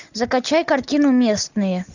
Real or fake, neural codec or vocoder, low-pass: real; none; 7.2 kHz